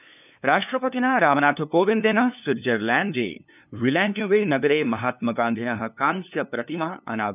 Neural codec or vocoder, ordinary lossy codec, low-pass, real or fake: codec, 16 kHz, 2 kbps, FunCodec, trained on LibriTTS, 25 frames a second; none; 3.6 kHz; fake